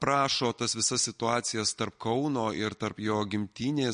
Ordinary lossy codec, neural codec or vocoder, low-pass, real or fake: MP3, 48 kbps; none; 9.9 kHz; real